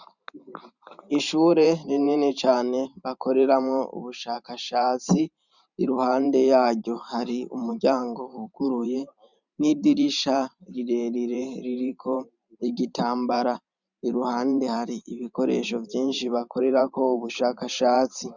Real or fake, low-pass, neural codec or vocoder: fake; 7.2 kHz; vocoder, 44.1 kHz, 128 mel bands every 512 samples, BigVGAN v2